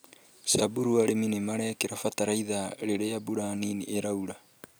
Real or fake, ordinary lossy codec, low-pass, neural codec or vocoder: real; none; none; none